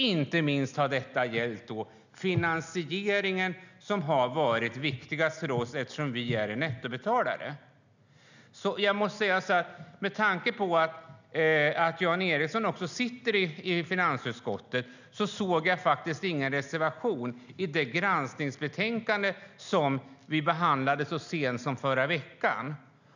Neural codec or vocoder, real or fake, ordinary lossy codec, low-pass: none; real; none; 7.2 kHz